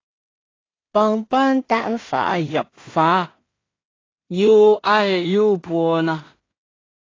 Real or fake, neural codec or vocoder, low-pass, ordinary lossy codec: fake; codec, 16 kHz in and 24 kHz out, 0.4 kbps, LongCat-Audio-Codec, two codebook decoder; 7.2 kHz; AAC, 32 kbps